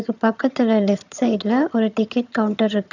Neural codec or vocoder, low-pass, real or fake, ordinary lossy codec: vocoder, 22.05 kHz, 80 mel bands, HiFi-GAN; 7.2 kHz; fake; none